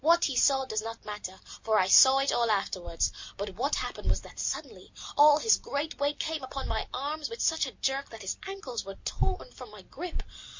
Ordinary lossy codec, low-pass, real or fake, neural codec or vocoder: MP3, 48 kbps; 7.2 kHz; real; none